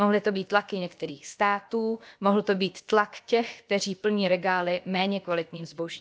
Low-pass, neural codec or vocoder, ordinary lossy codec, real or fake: none; codec, 16 kHz, about 1 kbps, DyCAST, with the encoder's durations; none; fake